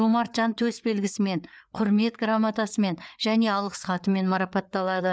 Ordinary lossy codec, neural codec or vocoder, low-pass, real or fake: none; codec, 16 kHz, 4 kbps, FreqCodec, larger model; none; fake